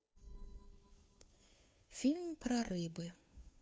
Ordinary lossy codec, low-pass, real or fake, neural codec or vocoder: none; none; fake; codec, 16 kHz, 2 kbps, FunCodec, trained on Chinese and English, 25 frames a second